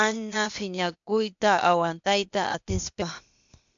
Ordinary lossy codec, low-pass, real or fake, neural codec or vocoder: MP3, 64 kbps; 7.2 kHz; fake; codec, 16 kHz, 0.8 kbps, ZipCodec